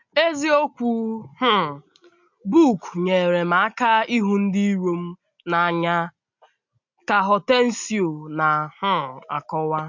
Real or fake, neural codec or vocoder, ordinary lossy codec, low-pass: real; none; MP3, 48 kbps; 7.2 kHz